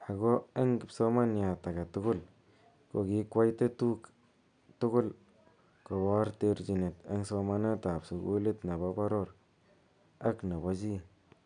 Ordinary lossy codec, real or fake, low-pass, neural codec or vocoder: none; real; 9.9 kHz; none